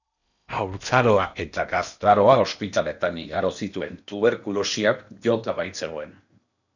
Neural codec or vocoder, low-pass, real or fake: codec, 16 kHz in and 24 kHz out, 0.8 kbps, FocalCodec, streaming, 65536 codes; 7.2 kHz; fake